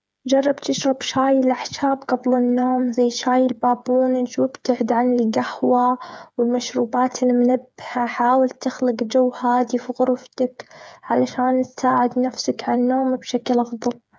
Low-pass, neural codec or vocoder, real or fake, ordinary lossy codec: none; codec, 16 kHz, 8 kbps, FreqCodec, smaller model; fake; none